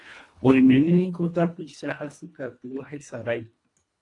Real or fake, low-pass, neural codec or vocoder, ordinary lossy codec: fake; 10.8 kHz; codec, 24 kHz, 1.5 kbps, HILCodec; AAC, 48 kbps